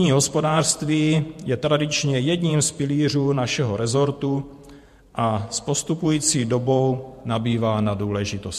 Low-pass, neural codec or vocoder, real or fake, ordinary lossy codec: 14.4 kHz; vocoder, 48 kHz, 128 mel bands, Vocos; fake; MP3, 64 kbps